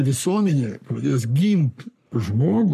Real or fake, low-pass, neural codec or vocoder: fake; 14.4 kHz; codec, 44.1 kHz, 3.4 kbps, Pupu-Codec